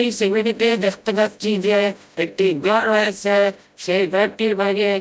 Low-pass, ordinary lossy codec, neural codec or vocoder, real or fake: none; none; codec, 16 kHz, 0.5 kbps, FreqCodec, smaller model; fake